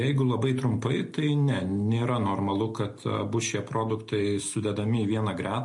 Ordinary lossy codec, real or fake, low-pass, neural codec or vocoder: MP3, 48 kbps; real; 10.8 kHz; none